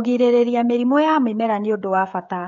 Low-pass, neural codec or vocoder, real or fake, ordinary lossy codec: 7.2 kHz; codec, 16 kHz, 16 kbps, FreqCodec, smaller model; fake; none